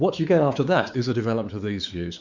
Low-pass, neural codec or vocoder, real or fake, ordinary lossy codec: 7.2 kHz; codec, 16 kHz, 4 kbps, X-Codec, WavLM features, trained on Multilingual LibriSpeech; fake; Opus, 64 kbps